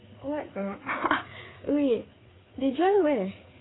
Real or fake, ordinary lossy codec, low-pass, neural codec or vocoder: fake; AAC, 16 kbps; 7.2 kHz; codec, 16 kHz, 4 kbps, FreqCodec, larger model